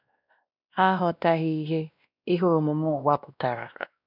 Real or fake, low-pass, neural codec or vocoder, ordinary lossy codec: fake; 5.4 kHz; codec, 16 kHz, 1 kbps, X-Codec, WavLM features, trained on Multilingual LibriSpeech; none